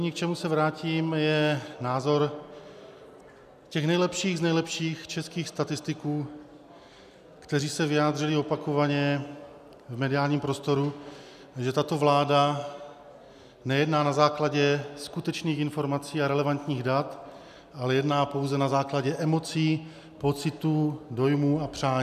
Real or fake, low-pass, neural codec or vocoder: real; 14.4 kHz; none